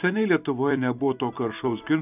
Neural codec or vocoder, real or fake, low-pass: vocoder, 44.1 kHz, 128 mel bands every 256 samples, BigVGAN v2; fake; 3.6 kHz